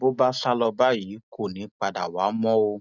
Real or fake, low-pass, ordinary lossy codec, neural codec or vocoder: real; 7.2 kHz; none; none